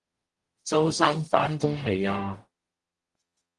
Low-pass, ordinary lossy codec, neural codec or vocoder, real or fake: 10.8 kHz; Opus, 24 kbps; codec, 44.1 kHz, 0.9 kbps, DAC; fake